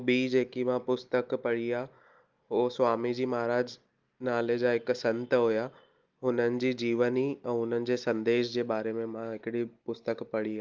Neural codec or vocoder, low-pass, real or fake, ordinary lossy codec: none; 7.2 kHz; real; Opus, 32 kbps